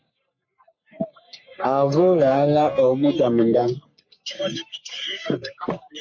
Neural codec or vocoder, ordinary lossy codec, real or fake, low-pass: codec, 44.1 kHz, 3.4 kbps, Pupu-Codec; MP3, 48 kbps; fake; 7.2 kHz